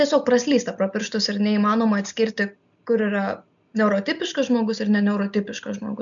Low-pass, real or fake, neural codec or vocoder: 7.2 kHz; real; none